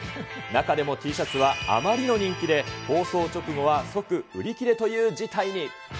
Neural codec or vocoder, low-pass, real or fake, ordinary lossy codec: none; none; real; none